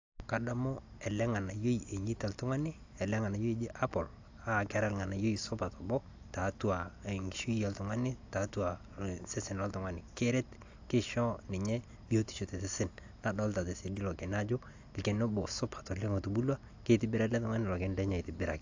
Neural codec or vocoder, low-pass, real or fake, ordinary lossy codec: none; 7.2 kHz; real; AAC, 48 kbps